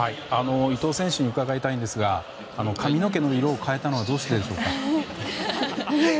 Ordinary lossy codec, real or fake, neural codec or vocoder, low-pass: none; real; none; none